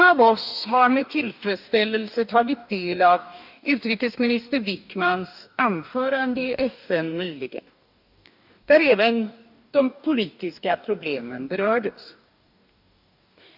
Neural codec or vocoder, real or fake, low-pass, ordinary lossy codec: codec, 44.1 kHz, 2.6 kbps, DAC; fake; 5.4 kHz; none